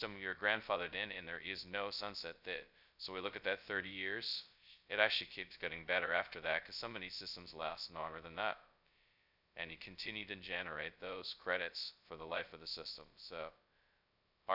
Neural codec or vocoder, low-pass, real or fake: codec, 16 kHz, 0.2 kbps, FocalCodec; 5.4 kHz; fake